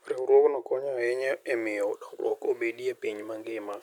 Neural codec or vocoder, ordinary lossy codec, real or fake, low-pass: none; none; real; 19.8 kHz